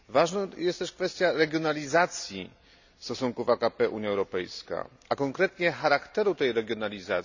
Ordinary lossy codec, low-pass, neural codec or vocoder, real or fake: none; 7.2 kHz; none; real